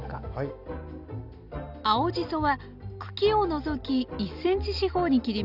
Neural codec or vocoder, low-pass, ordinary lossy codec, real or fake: none; 5.4 kHz; none; real